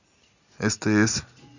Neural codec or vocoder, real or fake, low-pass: none; real; 7.2 kHz